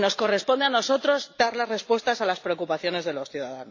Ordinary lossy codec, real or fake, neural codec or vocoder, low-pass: AAC, 48 kbps; real; none; 7.2 kHz